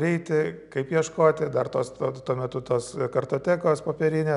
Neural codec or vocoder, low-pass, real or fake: none; 10.8 kHz; real